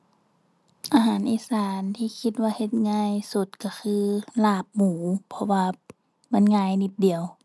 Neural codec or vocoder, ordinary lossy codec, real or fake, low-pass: none; none; real; none